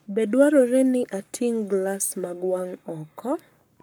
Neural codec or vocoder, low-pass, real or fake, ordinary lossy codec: codec, 44.1 kHz, 7.8 kbps, Pupu-Codec; none; fake; none